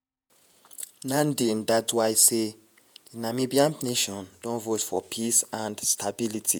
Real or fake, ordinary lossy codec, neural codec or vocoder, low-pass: fake; none; vocoder, 48 kHz, 128 mel bands, Vocos; none